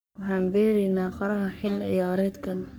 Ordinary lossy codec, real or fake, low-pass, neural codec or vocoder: none; fake; none; codec, 44.1 kHz, 3.4 kbps, Pupu-Codec